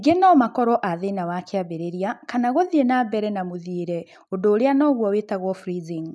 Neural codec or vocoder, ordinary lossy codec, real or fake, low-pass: none; none; real; none